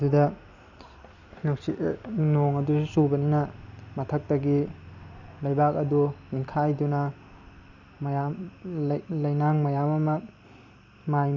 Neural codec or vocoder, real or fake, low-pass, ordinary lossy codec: vocoder, 44.1 kHz, 128 mel bands every 256 samples, BigVGAN v2; fake; 7.2 kHz; none